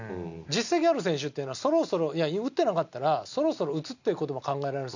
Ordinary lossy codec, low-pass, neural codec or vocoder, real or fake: none; 7.2 kHz; none; real